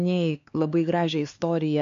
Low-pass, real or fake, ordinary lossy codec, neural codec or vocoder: 7.2 kHz; fake; MP3, 64 kbps; codec, 16 kHz, 4 kbps, X-Codec, HuBERT features, trained on LibriSpeech